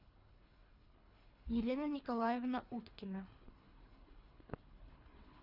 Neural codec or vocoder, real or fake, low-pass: codec, 24 kHz, 3 kbps, HILCodec; fake; 5.4 kHz